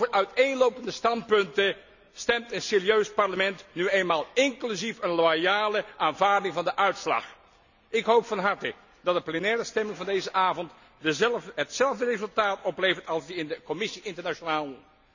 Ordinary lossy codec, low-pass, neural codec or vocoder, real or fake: none; 7.2 kHz; none; real